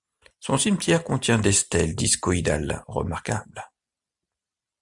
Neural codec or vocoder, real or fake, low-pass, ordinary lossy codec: none; real; 10.8 kHz; MP3, 96 kbps